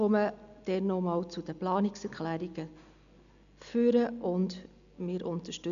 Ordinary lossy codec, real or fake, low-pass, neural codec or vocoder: none; real; 7.2 kHz; none